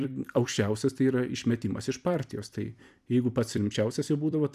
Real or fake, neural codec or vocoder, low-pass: fake; vocoder, 44.1 kHz, 128 mel bands every 256 samples, BigVGAN v2; 14.4 kHz